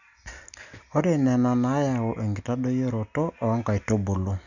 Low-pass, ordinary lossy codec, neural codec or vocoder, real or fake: 7.2 kHz; none; none; real